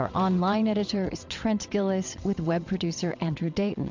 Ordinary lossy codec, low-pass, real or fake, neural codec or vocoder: MP3, 64 kbps; 7.2 kHz; fake; vocoder, 22.05 kHz, 80 mel bands, WaveNeXt